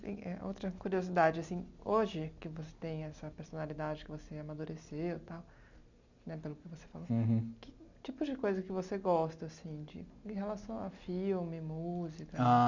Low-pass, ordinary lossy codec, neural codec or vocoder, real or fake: 7.2 kHz; none; none; real